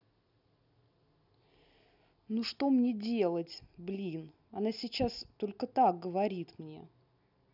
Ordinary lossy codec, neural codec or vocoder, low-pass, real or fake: none; none; 5.4 kHz; real